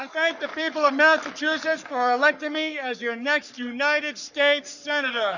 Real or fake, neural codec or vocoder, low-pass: fake; codec, 44.1 kHz, 3.4 kbps, Pupu-Codec; 7.2 kHz